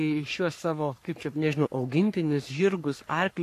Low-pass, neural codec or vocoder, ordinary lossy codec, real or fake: 14.4 kHz; codec, 44.1 kHz, 3.4 kbps, Pupu-Codec; AAC, 64 kbps; fake